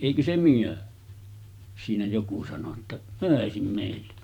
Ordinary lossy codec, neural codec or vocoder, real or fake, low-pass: none; vocoder, 44.1 kHz, 128 mel bands every 512 samples, BigVGAN v2; fake; 19.8 kHz